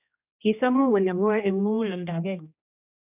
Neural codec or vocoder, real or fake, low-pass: codec, 16 kHz, 1 kbps, X-Codec, HuBERT features, trained on general audio; fake; 3.6 kHz